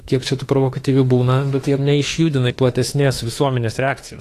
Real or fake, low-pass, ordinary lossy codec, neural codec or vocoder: fake; 14.4 kHz; AAC, 48 kbps; autoencoder, 48 kHz, 32 numbers a frame, DAC-VAE, trained on Japanese speech